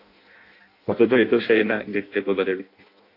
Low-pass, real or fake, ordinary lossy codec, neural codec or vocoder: 5.4 kHz; fake; AAC, 32 kbps; codec, 16 kHz in and 24 kHz out, 0.6 kbps, FireRedTTS-2 codec